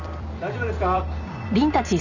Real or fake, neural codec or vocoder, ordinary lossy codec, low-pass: fake; vocoder, 44.1 kHz, 128 mel bands every 256 samples, BigVGAN v2; none; 7.2 kHz